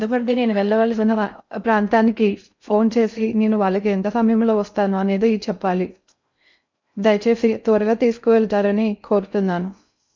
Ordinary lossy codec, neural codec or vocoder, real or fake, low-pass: MP3, 64 kbps; codec, 16 kHz in and 24 kHz out, 0.6 kbps, FocalCodec, streaming, 4096 codes; fake; 7.2 kHz